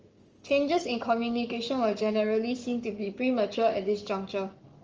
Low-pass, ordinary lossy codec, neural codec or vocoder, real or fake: 7.2 kHz; Opus, 24 kbps; codec, 44.1 kHz, 7.8 kbps, Pupu-Codec; fake